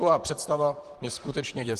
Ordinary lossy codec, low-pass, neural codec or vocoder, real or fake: Opus, 24 kbps; 14.4 kHz; vocoder, 44.1 kHz, 128 mel bands, Pupu-Vocoder; fake